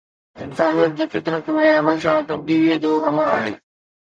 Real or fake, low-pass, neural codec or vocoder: fake; 9.9 kHz; codec, 44.1 kHz, 0.9 kbps, DAC